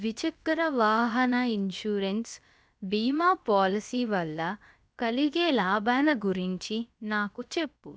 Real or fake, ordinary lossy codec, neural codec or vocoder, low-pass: fake; none; codec, 16 kHz, about 1 kbps, DyCAST, with the encoder's durations; none